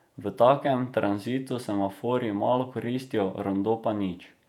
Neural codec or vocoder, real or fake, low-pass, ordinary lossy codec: vocoder, 48 kHz, 128 mel bands, Vocos; fake; 19.8 kHz; none